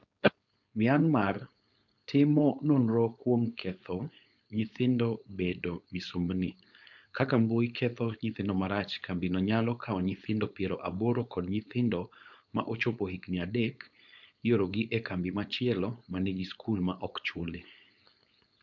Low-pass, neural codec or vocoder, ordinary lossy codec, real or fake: 7.2 kHz; codec, 16 kHz, 4.8 kbps, FACodec; none; fake